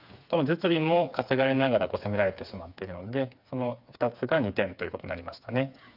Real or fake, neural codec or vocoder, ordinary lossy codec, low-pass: fake; codec, 16 kHz, 4 kbps, FreqCodec, smaller model; none; 5.4 kHz